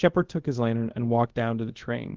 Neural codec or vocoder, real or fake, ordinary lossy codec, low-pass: codec, 24 kHz, 0.5 kbps, DualCodec; fake; Opus, 16 kbps; 7.2 kHz